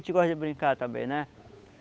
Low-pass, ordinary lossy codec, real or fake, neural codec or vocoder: none; none; fake; codec, 16 kHz, 8 kbps, FunCodec, trained on Chinese and English, 25 frames a second